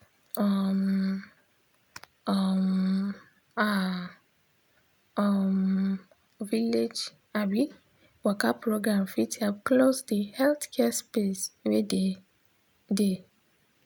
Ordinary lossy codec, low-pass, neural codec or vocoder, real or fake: none; none; none; real